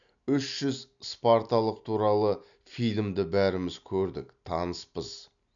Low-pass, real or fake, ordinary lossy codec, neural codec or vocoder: 7.2 kHz; real; none; none